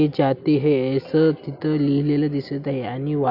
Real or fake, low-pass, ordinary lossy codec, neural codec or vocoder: real; 5.4 kHz; AAC, 48 kbps; none